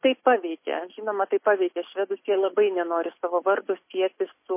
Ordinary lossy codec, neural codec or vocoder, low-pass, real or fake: MP3, 24 kbps; none; 3.6 kHz; real